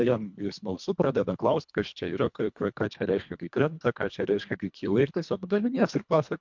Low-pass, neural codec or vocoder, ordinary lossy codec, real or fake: 7.2 kHz; codec, 24 kHz, 1.5 kbps, HILCodec; MP3, 64 kbps; fake